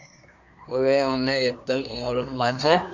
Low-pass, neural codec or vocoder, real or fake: 7.2 kHz; codec, 24 kHz, 1 kbps, SNAC; fake